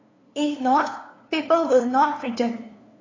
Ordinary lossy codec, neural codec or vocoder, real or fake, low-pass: AAC, 32 kbps; codec, 16 kHz, 2 kbps, FunCodec, trained on LibriTTS, 25 frames a second; fake; 7.2 kHz